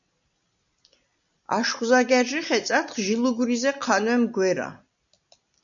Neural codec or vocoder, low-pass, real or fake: none; 7.2 kHz; real